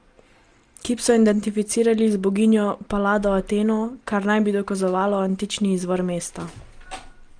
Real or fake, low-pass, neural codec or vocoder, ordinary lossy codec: real; 9.9 kHz; none; Opus, 24 kbps